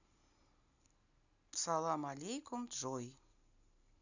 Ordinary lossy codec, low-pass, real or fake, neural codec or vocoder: none; 7.2 kHz; real; none